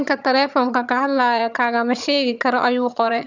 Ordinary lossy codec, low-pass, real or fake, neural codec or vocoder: none; 7.2 kHz; fake; vocoder, 22.05 kHz, 80 mel bands, HiFi-GAN